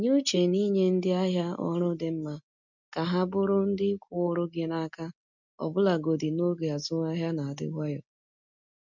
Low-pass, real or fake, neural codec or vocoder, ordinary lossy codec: 7.2 kHz; real; none; none